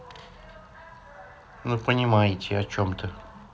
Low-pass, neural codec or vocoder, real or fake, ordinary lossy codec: none; none; real; none